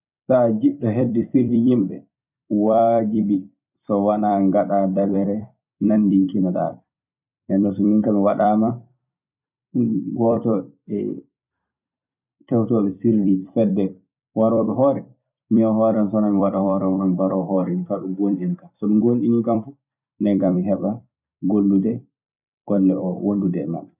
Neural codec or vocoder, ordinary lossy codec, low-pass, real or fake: vocoder, 44.1 kHz, 128 mel bands every 256 samples, BigVGAN v2; none; 3.6 kHz; fake